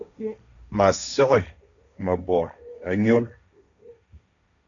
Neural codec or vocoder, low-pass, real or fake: codec, 16 kHz, 1.1 kbps, Voila-Tokenizer; 7.2 kHz; fake